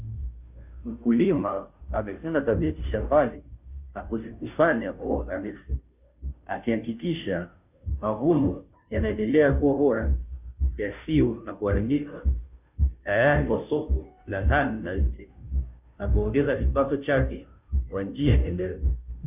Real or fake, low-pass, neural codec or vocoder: fake; 3.6 kHz; codec, 16 kHz, 0.5 kbps, FunCodec, trained on Chinese and English, 25 frames a second